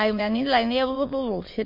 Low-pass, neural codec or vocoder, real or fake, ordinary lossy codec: 5.4 kHz; autoencoder, 22.05 kHz, a latent of 192 numbers a frame, VITS, trained on many speakers; fake; MP3, 32 kbps